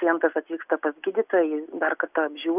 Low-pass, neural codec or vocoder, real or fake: 3.6 kHz; none; real